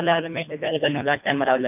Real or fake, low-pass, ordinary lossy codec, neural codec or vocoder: fake; 3.6 kHz; none; codec, 24 kHz, 1.5 kbps, HILCodec